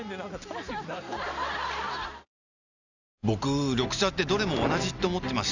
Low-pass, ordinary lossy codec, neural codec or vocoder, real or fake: 7.2 kHz; none; none; real